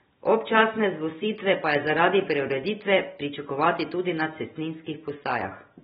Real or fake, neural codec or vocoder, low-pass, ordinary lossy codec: real; none; 10.8 kHz; AAC, 16 kbps